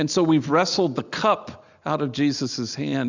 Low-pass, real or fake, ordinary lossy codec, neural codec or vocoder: 7.2 kHz; real; Opus, 64 kbps; none